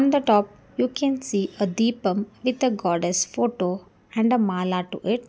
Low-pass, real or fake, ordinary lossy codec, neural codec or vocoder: none; real; none; none